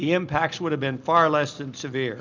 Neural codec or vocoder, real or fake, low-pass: none; real; 7.2 kHz